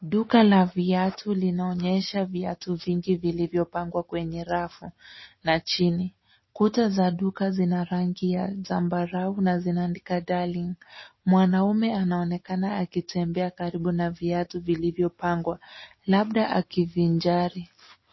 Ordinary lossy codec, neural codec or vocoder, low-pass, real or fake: MP3, 24 kbps; none; 7.2 kHz; real